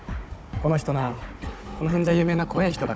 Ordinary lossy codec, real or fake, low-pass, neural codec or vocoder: none; fake; none; codec, 16 kHz, 16 kbps, FunCodec, trained on Chinese and English, 50 frames a second